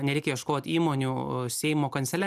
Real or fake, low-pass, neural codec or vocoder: real; 14.4 kHz; none